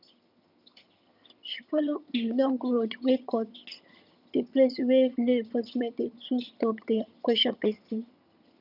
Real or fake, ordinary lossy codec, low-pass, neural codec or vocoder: fake; none; 5.4 kHz; vocoder, 22.05 kHz, 80 mel bands, HiFi-GAN